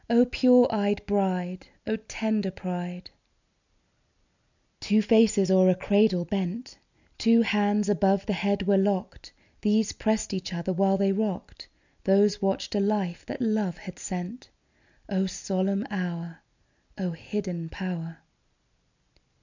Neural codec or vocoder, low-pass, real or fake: none; 7.2 kHz; real